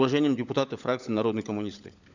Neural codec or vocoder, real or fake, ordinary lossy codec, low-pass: codec, 16 kHz, 16 kbps, FunCodec, trained on LibriTTS, 50 frames a second; fake; none; 7.2 kHz